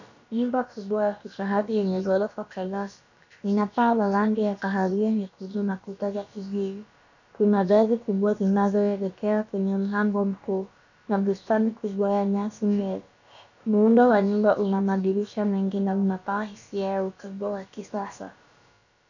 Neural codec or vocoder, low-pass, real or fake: codec, 16 kHz, about 1 kbps, DyCAST, with the encoder's durations; 7.2 kHz; fake